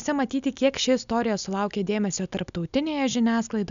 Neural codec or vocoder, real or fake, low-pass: none; real; 7.2 kHz